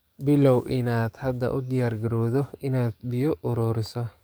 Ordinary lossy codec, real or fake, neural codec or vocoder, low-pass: none; fake; codec, 44.1 kHz, 7.8 kbps, DAC; none